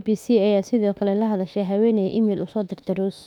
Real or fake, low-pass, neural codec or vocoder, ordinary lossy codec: fake; 19.8 kHz; autoencoder, 48 kHz, 32 numbers a frame, DAC-VAE, trained on Japanese speech; none